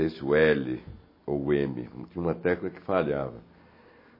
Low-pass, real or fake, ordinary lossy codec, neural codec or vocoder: 5.4 kHz; real; MP3, 24 kbps; none